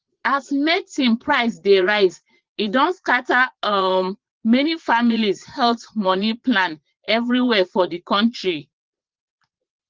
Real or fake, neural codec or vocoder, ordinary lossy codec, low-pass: fake; vocoder, 22.05 kHz, 80 mel bands, Vocos; Opus, 16 kbps; 7.2 kHz